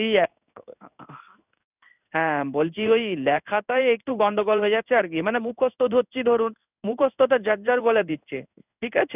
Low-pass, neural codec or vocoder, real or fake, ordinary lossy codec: 3.6 kHz; codec, 16 kHz in and 24 kHz out, 1 kbps, XY-Tokenizer; fake; none